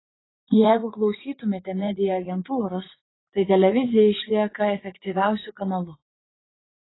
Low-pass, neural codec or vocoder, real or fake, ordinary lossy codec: 7.2 kHz; vocoder, 44.1 kHz, 80 mel bands, Vocos; fake; AAC, 16 kbps